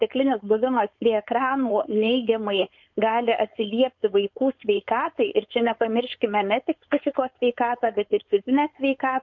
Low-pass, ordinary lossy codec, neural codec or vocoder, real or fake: 7.2 kHz; MP3, 48 kbps; codec, 16 kHz, 4.8 kbps, FACodec; fake